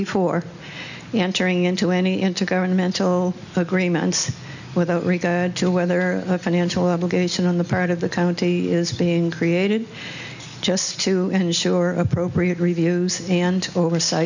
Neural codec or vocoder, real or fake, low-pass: none; real; 7.2 kHz